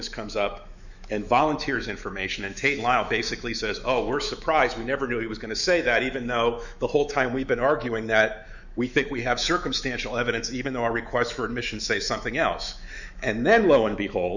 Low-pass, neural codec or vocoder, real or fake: 7.2 kHz; autoencoder, 48 kHz, 128 numbers a frame, DAC-VAE, trained on Japanese speech; fake